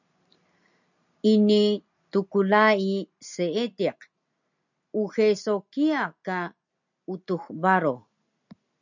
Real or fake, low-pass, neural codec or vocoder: real; 7.2 kHz; none